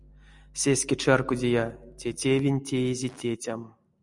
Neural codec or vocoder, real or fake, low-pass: none; real; 10.8 kHz